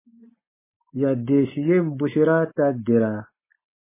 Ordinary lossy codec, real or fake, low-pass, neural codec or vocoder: MP3, 16 kbps; real; 3.6 kHz; none